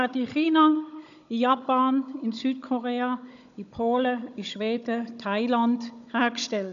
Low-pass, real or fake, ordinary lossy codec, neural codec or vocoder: 7.2 kHz; fake; none; codec, 16 kHz, 16 kbps, FunCodec, trained on Chinese and English, 50 frames a second